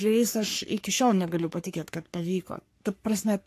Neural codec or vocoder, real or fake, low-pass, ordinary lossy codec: codec, 44.1 kHz, 3.4 kbps, Pupu-Codec; fake; 14.4 kHz; AAC, 64 kbps